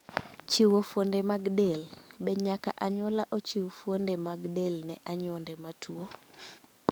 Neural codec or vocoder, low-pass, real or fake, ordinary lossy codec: codec, 44.1 kHz, 7.8 kbps, DAC; none; fake; none